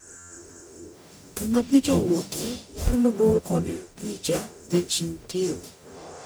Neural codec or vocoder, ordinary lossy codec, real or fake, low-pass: codec, 44.1 kHz, 0.9 kbps, DAC; none; fake; none